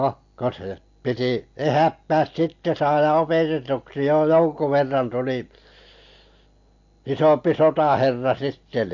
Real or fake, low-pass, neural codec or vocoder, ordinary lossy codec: real; 7.2 kHz; none; MP3, 48 kbps